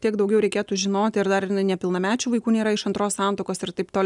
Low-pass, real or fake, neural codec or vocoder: 10.8 kHz; real; none